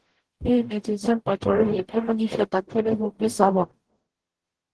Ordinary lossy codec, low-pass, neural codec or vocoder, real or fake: Opus, 16 kbps; 10.8 kHz; codec, 44.1 kHz, 0.9 kbps, DAC; fake